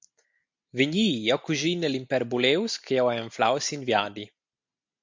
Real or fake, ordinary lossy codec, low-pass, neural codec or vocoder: real; MP3, 64 kbps; 7.2 kHz; none